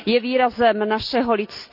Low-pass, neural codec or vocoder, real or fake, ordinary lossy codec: 5.4 kHz; none; real; none